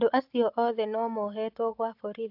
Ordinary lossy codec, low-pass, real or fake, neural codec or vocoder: AAC, 48 kbps; 5.4 kHz; real; none